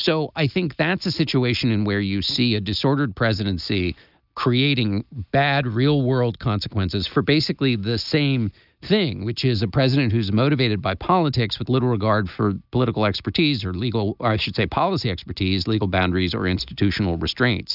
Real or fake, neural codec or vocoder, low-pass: real; none; 5.4 kHz